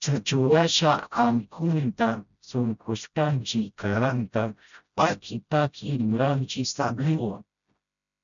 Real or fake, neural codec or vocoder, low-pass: fake; codec, 16 kHz, 0.5 kbps, FreqCodec, smaller model; 7.2 kHz